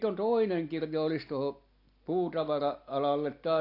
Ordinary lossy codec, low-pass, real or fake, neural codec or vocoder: AAC, 32 kbps; 5.4 kHz; real; none